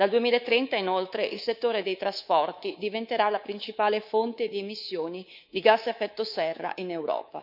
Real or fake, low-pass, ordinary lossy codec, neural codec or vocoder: fake; 5.4 kHz; none; codec, 24 kHz, 3.1 kbps, DualCodec